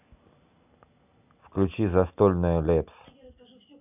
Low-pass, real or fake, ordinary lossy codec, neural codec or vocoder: 3.6 kHz; real; none; none